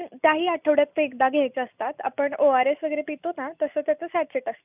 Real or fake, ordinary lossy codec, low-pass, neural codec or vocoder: real; none; 3.6 kHz; none